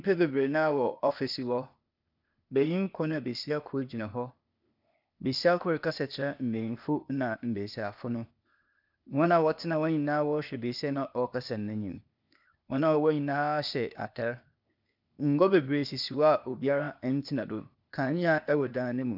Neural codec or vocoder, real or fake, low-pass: codec, 16 kHz, 0.8 kbps, ZipCodec; fake; 5.4 kHz